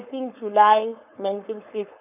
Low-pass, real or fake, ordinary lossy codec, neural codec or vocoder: 3.6 kHz; fake; none; codec, 16 kHz, 4.8 kbps, FACodec